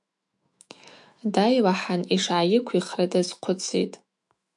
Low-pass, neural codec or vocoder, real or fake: 10.8 kHz; autoencoder, 48 kHz, 128 numbers a frame, DAC-VAE, trained on Japanese speech; fake